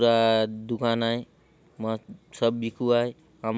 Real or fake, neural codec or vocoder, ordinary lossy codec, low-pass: real; none; none; none